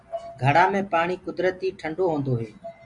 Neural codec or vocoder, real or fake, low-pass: none; real; 10.8 kHz